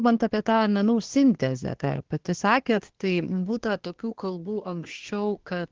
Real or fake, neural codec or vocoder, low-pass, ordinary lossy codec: fake; codec, 24 kHz, 1 kbps, SNAC; 7.2 kHz; Opus, 16 kbps